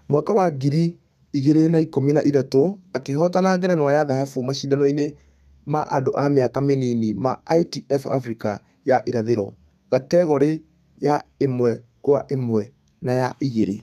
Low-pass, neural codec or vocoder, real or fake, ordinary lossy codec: 14.4 kHz; codec, 32 kHz, 1.9 kbps, SNAC; fake; none